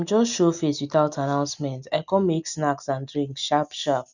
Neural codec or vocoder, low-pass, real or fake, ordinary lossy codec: none; 7.2 kHz; real; none